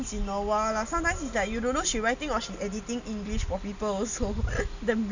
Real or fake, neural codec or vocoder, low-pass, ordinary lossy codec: real; none; 7.2 kHz; none